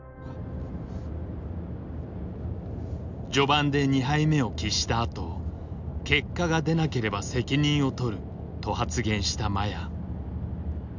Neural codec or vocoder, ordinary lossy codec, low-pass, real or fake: none; none; 7.2 kHz; real